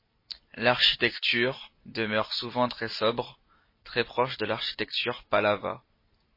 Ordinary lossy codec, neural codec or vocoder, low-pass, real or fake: MP3, 24 kbps; none; 5.4 kHz; real